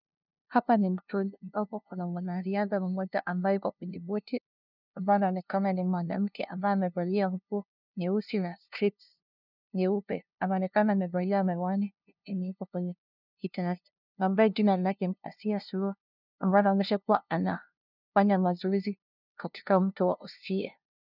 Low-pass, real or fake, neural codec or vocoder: 5.4 kHz; fake; codec, 16 kHz, 0.5 kbps, FunCodec, trained on LibriTTS, 25 frames a second